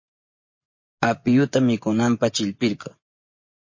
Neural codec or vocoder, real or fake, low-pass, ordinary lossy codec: vocoder, 24 kHz, 100 mel bands, Vocos; fake; 7.2 kHz; MP3, 32 kbps